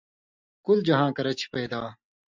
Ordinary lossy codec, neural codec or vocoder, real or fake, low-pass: MP3, 64 kbps; none; real; 7.2 kHz